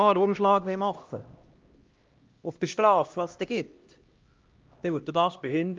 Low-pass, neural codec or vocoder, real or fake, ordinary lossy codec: 7.2 kHz; codec, 16 kHz, 1 kbps, X-Codec, HuBERT features, trained on LibriSpeech; fake; Opus, 24 kbps